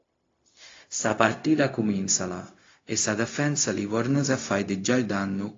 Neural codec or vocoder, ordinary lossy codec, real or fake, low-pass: codec, 16 kHz, 0.4 kbps, LongCat-Audio-Codec; AAC, 32 kbps; fake; 7.2 kHz